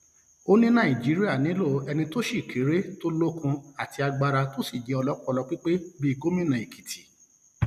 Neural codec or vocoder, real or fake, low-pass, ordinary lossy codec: none; real; 14.4 kHz; none